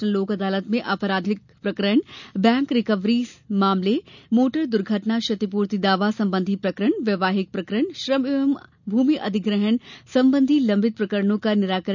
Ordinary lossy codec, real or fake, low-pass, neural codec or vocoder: none; real; 7.2 kHz; none